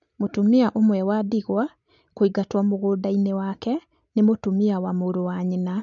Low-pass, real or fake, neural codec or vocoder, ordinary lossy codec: 7.2 kHz; real; none; none